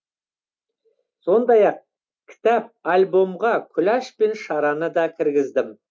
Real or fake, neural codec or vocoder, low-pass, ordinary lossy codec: real; none; none; none